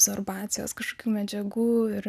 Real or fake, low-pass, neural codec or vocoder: real; 14.4 kHz; none